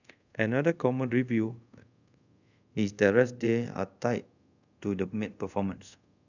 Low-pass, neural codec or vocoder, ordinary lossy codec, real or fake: 7.2 kHz; codec, 24 kHz, 0.5 kbps, DualCodec; none; fake